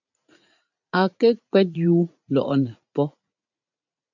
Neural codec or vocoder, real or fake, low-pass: vocoder, 44.1 kHz, 80 mel bands, Vocos; fake; 7.2 kHz